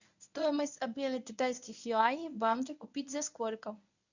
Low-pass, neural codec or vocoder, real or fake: 7.2 kHz; codec, 24 kHz, 0.9 kbps, WavTokenizer, medium speech release version 1; fake